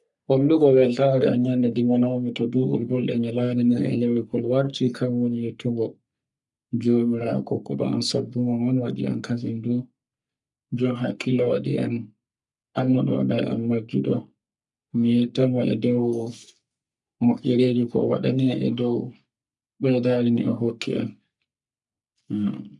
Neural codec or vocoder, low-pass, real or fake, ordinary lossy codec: codec, 44.1 kHz, 3.4 kbps, Pupu-Codec; 10.8 kHz; fake; none